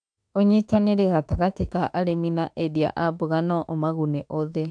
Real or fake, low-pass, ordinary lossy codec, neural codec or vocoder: fake; 9.9 kHz; AAC, 64 kbps; autoencoder, 48 kHz, 32 numbers a frame, DAC-VAE, trained on Japanese speech